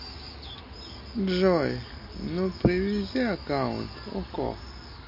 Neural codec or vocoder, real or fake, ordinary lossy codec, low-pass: none; real; MP3, 32 kbps; 5.4 kHz